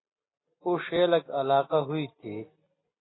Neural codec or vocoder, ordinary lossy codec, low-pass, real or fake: none; AAC, 16 kbps; 7.2 kHz; real